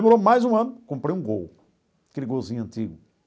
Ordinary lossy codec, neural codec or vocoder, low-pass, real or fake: none; none; none; real